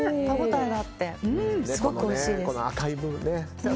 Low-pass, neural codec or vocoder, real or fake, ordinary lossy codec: none; none; real; none